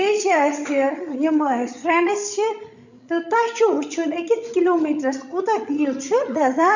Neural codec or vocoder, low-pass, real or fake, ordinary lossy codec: codec, 16 kHz, 16 kbps, FreqCodec, larger model; 7.2 kHz; fake; none